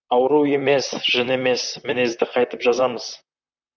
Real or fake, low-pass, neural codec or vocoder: fake; 7.2 kHz; vocoder, 44.1 kHz, 128 mel bands, Pupu-Vocoder